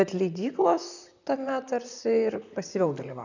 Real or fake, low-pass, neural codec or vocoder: fake; 7.2 kHz; vocoder, 44.1 kHz, 128 mel bands, Pupu-Vocoder